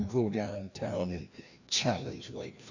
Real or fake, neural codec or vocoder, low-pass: fake; codec, 16 kHz, 1 kbps, FreqCodec, larger model; 7.2 kHz